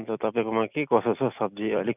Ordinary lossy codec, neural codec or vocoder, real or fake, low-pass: none; none; real; 3.6 kHz